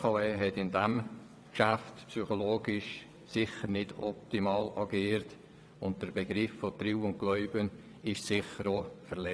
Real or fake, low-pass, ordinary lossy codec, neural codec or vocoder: fake; none; none; vocoder, 22.05 kHz, 80 mel bands, WaveNeXt